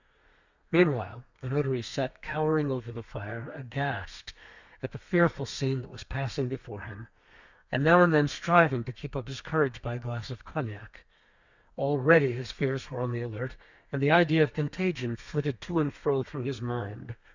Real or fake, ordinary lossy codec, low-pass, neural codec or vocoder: fake; Opus, 64 kbps; 7.2 kHz; codec, 32 kHz, 1.9 kbps, SNAC